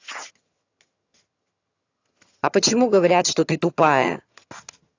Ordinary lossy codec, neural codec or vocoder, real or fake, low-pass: AAC, 48 kbps; vocoder, 22.05 kHz, 80 mel bands, HiFi-GAN; fake; 7.2 kHz